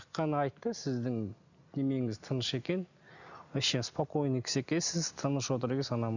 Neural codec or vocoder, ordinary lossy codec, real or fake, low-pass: none; none; real; 7.2 kHz